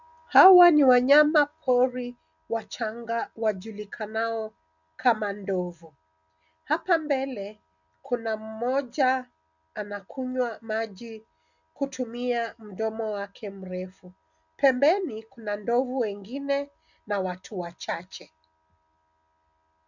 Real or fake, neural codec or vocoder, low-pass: real; none; 7.2 kHz